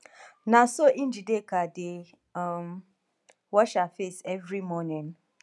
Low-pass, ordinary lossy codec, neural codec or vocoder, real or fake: none; none; none; real